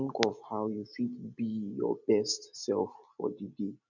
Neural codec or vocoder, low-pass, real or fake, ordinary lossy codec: none; 7.2 kHz; real; none